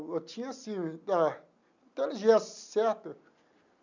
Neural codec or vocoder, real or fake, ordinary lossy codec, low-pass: none; real; none; 7.2 kHz